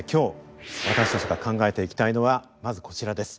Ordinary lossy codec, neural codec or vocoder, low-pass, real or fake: none; none; none; real